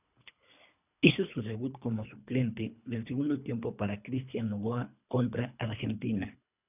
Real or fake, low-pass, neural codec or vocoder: fake; 3.6 kHz; codec, 24 kHz, 3 kbps, HILCodec